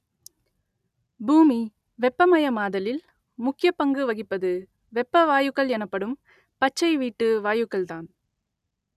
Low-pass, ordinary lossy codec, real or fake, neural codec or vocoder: 14.4 kHz; none; real; none